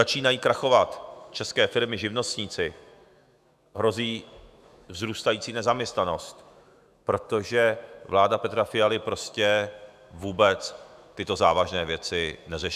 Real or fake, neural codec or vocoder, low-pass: fake; autoencoder, 48 kHz, 128 numbers a frame, DAC-VAE, trained on Japanese speech; 14.4 kHz